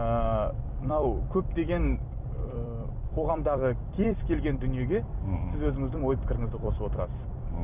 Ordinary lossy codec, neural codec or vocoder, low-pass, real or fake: none; vocoder, 44.1 kHz, 128 mel bands every 256 samples, BigVGAN v2; 3.6 kHz; fake